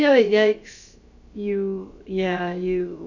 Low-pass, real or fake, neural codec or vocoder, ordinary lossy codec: 7.2 kHz; fake; codec, 16 kHz, about 1 kbps, DyCAST, with the encoder's durations; none